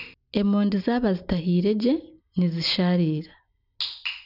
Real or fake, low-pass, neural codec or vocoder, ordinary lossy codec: real; 5.4 kHz; none; none